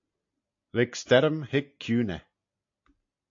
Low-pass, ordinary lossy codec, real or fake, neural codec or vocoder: 7.2 kHz; AAC, 48 kbps; real; none